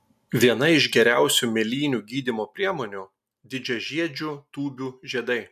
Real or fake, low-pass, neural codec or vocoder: real; 14.4 kHz; none